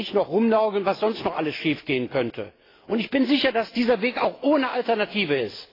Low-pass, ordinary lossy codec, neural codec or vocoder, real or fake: 5.4 kHz; AAC, 24 kbps; none; real